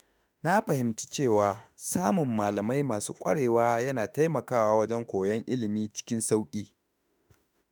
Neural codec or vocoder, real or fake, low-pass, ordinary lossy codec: autoencoder, 48 kHz, 32 numbers a frame, DAC-VAE, trained on Japanese speech; fake; none; none